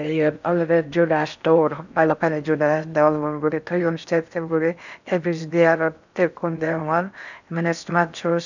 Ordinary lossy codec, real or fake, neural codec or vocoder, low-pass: none; fake; codec, 16 kHz in and 24 kHz out, 0.6 kbps, FocalCodec, streaming, 4096 codes; 7.2 kHz